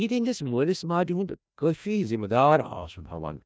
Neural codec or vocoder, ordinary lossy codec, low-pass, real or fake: codec, 16 kHz, 1 kbps, FreqCodec, larger model; none; none; fake